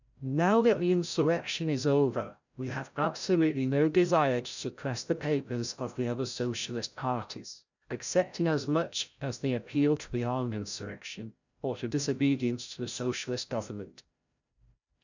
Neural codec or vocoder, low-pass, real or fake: codec, 16 kHz, 0.5 kbps, FreqCodec, larger model; 7.2 kHz; fake